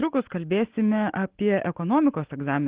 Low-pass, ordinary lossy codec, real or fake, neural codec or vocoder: 3.6 kHz; Opus, 16 kbps; real; none